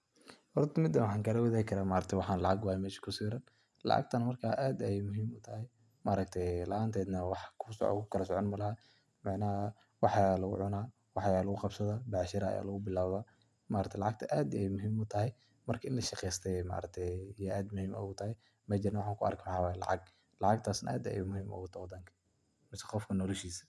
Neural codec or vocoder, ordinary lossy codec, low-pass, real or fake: none; none; none; real